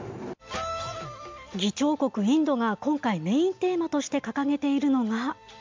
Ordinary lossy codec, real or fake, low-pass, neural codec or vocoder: MP3, 64 kbps; real; 7.2 kHz; none